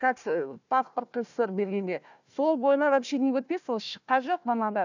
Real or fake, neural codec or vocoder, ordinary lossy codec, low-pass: fake; codec, 16 kHz, 1 kbps, FunCodec, trained on Chinese and English, 50 frames a second; none; 7.2 kHz